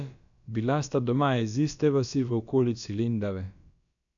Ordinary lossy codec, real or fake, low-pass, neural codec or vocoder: none; fake; 7.2 kHz; codec, 16 kHz, about 1 kbps, DyCAST, with the encoder's durations